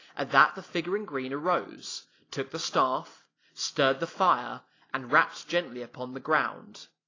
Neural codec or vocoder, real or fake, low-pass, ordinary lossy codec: none; real; 7.2 kHz; AAC, 32 kbps